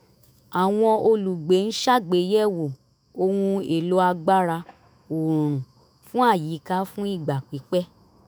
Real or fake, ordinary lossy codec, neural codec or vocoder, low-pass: fake; none; autoencoder, 48 kHz, 128 numbers a frame, DAC-VAE, trained on Japanese speech; none